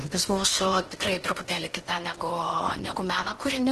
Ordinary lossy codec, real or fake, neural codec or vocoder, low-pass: Opus, 16 kbps; fake; codec, 16 kHz in and 24 kHz out, 0.8 kbps, FocalCodec, streaming, 65536 codes; 10.8 kHz